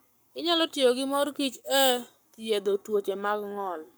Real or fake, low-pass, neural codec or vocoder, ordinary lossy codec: fake; none; codec, 44.1 kHz, 7.8 kbps, Pupu-Codec; none